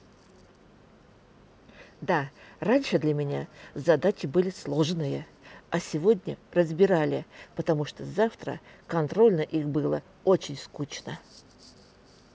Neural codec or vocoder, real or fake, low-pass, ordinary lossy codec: none; real; none; none